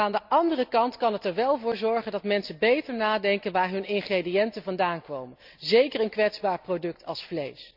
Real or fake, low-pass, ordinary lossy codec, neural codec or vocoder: real; 5.4 kHz; none; none